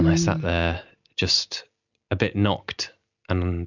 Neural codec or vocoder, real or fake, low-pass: none; real; 7.2 kHz